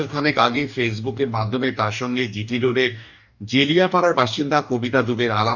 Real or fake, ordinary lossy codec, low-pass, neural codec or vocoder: fake; none; 7.2 kHz; codec, 44.1 kHz, 2.6 kbps, DAC